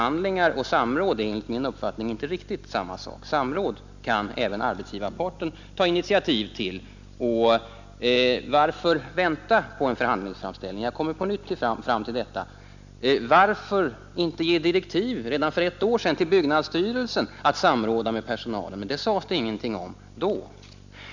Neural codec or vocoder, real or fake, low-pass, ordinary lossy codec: none; real; 7.2 kHz; none